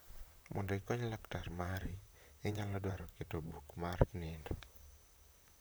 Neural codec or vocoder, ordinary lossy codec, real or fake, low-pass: vocoder, 44.1 kHz, 128 mel bands, Pupu-Vocoder; none; fake; none